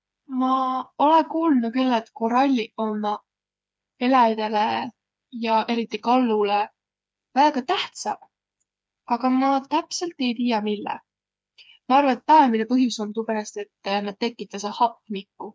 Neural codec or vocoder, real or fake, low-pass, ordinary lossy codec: codec, 16 kHz, 4 kbps, FreqCodec, smaller model; fake; none; none